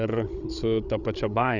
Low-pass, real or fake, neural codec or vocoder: 7.2 kHz; fake; codec, 16 kHz, 16 kbps, FreqCodec, larger model